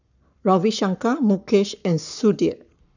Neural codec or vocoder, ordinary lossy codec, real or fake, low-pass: codec, 44.1 kHz, 7.8 kbps, Pupu-Codec; none; fake; 7.2 kHz